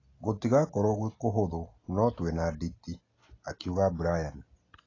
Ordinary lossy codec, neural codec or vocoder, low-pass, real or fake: AAC, 32 kbps; none; 7.2 kHz; real